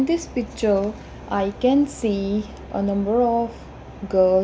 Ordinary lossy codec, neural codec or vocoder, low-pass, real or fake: none; none; none; real